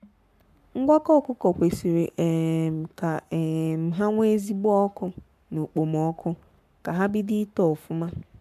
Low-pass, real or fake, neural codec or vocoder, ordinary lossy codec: 14.4 kHz; fake; codec, 44.1 kHz, 7.8 kbps, Pupu-Codec; MP3, 96 kbps